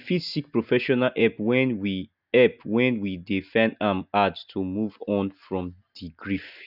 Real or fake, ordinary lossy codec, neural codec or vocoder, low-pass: real; none; none; 5.4 kHz